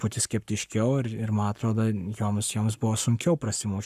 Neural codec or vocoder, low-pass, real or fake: codec, 44.1 kHz, 7.8 kbps, Pupu-Codec; 14.4 kHz; fake